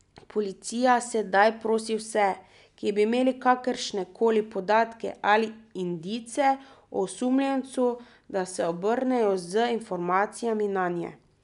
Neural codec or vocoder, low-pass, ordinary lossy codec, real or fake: none; 10.8 kHz; none; real